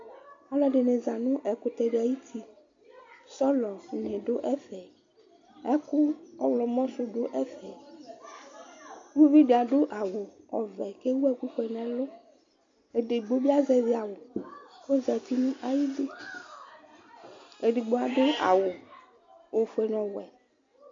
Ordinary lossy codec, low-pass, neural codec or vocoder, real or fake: MP3, 48 kbps; 7.2 kHz; none; real